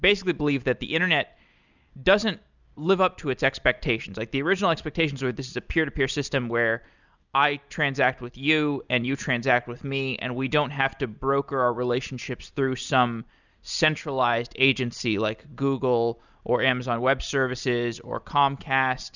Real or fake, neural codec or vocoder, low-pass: real; none; 7.2 kHz